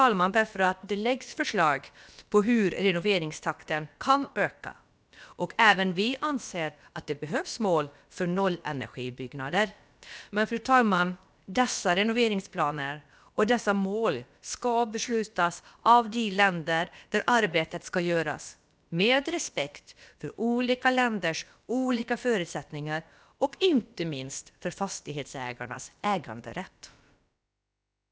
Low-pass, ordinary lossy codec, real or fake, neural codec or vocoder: none; none; fake; codec, 16 kHz, about 1 kbps, DyCAST, with the encoder's durations